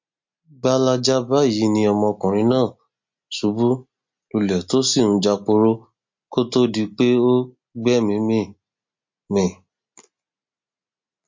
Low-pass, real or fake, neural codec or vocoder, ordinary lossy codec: 7.2 kHz; real; none; MP3, 48 kbps